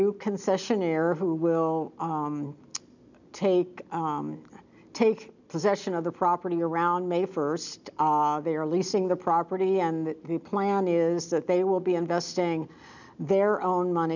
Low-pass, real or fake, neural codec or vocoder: 7.2 kHz; real; none